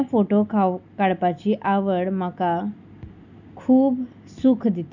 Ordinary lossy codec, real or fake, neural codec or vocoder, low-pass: none; real; none; 7.2 kHz